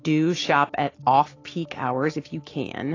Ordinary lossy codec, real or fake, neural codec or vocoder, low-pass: AAC, 32 kbps; real; none; 7.2 kHz